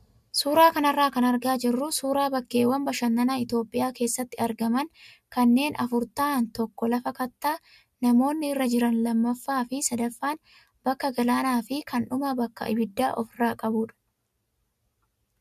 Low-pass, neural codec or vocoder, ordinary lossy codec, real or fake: 14.4 kHz; none; MP3, 96 kbps; real